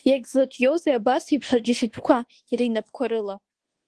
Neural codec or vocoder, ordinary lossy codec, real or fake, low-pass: codec, 24 kHz, 1.2 kbps, DualCodec; Opus, 16 kbps; fake; 10.8 kHz